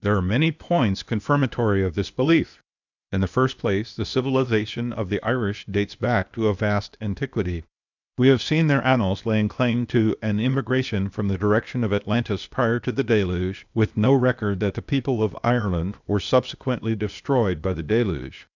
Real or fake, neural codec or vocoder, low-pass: fake; codec, 16 kHz, 0.8 kbps, ZipCodec; 7.2 kHz